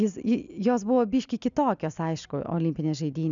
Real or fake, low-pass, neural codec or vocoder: real; 7.2 kHz; none